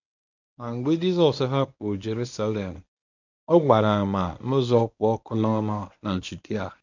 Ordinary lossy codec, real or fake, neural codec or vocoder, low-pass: none; fake; codec, 24 kHz, 0.9 kbps, WavTokenizer, medium speech release version 1; 7.2 kHz